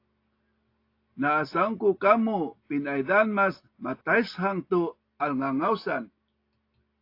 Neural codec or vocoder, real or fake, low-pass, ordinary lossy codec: none; real; 5.4 kHz; AAC, 32 kbps